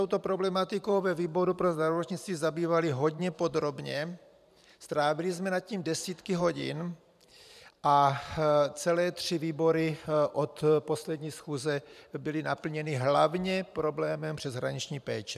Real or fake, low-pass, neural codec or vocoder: fake; 14.4 kHz; vocoder, 44.1 kHz, 128 mel bands every 512 samples, BigVGAN v2